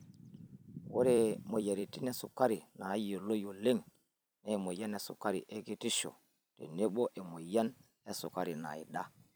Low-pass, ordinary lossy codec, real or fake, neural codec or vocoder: none; none; fake; vocoder, 44.1 kHz, 128 mel bands every 256 samples, BigVGAN v2